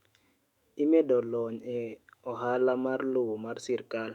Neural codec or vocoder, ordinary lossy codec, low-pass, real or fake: autoencoder, 48 kHz, 128 numbers a frame, DAC-VAE, trained on Japanese speech; Opus, 64 kbps; 19.8 kHz; fake